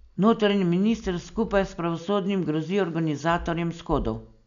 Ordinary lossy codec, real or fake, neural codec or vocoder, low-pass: none; real; none; 7.2 kHz